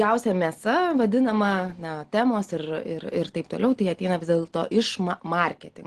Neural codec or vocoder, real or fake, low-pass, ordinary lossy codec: none; real; 10.8 kHz; Opus, 16 kbps